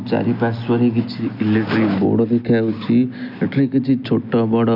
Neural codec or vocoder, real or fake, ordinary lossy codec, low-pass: none; real; none; 5.4 kHz